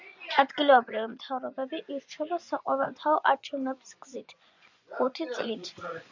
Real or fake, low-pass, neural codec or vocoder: fake; 7.2 kHz; vocoder, 24 kHz, 100 mel bands, Vocos